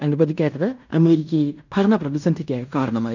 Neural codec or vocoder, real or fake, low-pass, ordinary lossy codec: codec, 16 kHz in and 24 kHz out, 0.9 kbps, LongCat-Audio-Codec, fine tuned four codebook decoder; fake; 7.2 kHz; none